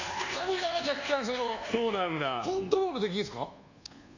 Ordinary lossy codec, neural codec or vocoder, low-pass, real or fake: none; codec, 24 kHz, 1.2 kbps, DualCodec; 7.2 kHz; fake